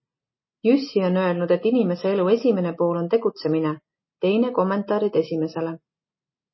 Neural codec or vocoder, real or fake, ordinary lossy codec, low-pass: none; real; MP3, 24 kbps; 7.2 kHz